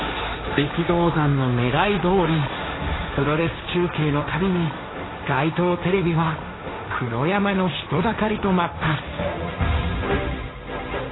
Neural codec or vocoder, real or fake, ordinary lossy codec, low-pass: codec, 16 kHz, 1.1 kbps, Voila-Tokenizer; fake; AAC, 16 kbps; 7.2 kHz